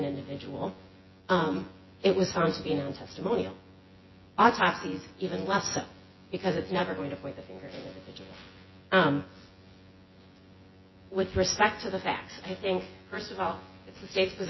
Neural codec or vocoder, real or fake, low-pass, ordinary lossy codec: vocoder, 24 kHz, 100 mel bands, Vocos; fake; 7.2 kHz; MP3, 24 kbps